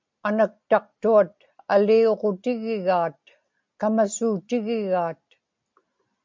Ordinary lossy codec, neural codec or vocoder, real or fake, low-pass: MP3, 64 kbps; none; real; 7.2 kHz